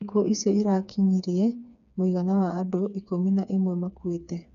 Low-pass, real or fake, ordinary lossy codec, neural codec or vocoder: 7.2 kHz; fake; none; codec, 16 kHz, 4 kbps, FreqCodec, smaller model